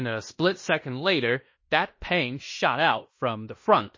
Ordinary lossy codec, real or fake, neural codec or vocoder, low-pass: MP3, 32 kbps; fake; codec, 16 kHz in and 24 kHz out, 0.9 kbps, LongCat-Audio-Codec, fine tuned four codebook decoder; 7.2 kHz